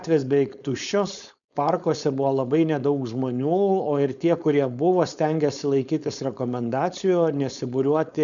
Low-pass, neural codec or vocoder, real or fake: 7.2 kHz; codec, 16 kHz, 4.8 kbps, FACodec; fake